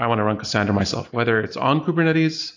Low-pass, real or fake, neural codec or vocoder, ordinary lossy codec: 7.2 kHz; real; none; AAC, 48 kbps